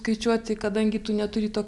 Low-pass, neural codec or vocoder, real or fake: 10.8 kHz; none; real